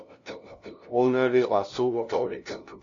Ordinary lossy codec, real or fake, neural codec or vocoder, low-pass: MP3, 48 kbps; fake; codec, 16 kHz, 0.5 kbps, FunCodec, trained on LibriTTS, 25 frames a second; 7.2 kHz